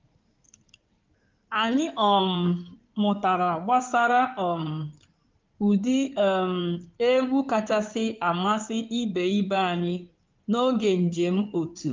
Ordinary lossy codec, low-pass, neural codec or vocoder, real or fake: Opus, 24 kbps; 7.2 kHz; codec, 16 kHz in and 24 kHz out, 2.2 kbps, FireRedTTS-2 codec; fake